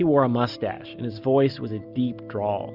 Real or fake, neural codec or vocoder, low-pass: real; none; 5.4 kHz